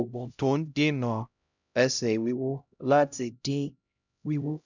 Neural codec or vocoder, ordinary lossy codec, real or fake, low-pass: codec, 16 kHz, 0.5 kbps, X-Codec, HuBERT features, trained on LibriSpeech; none; fake; 7.2 kHz